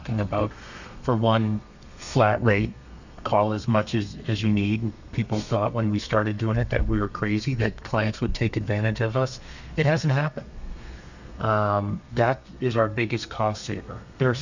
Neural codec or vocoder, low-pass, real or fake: codec, 32 kHz, 1.9 kbps, SNAC; 7.2 kHz; fake